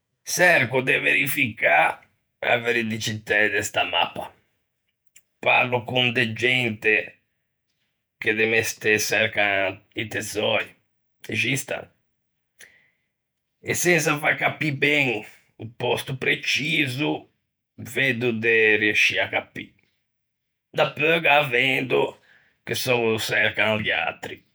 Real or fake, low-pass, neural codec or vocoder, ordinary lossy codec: fake; none; vocoder, 48 kHz, 128 mel bands, Vocos; none